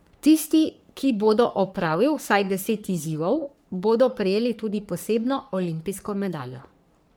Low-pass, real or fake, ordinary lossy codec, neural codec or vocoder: none; fake; none; codec, 44.1 kHz, 3.4 kbps, Pupu-Codec